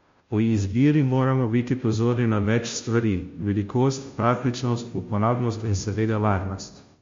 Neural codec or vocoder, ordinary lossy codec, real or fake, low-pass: codec, 16 kHz, 0.5 kbps, FunCodec, trained on Chinese and English, 25 frames a second; MP3, 48 kbps; fake; 7.2 kHz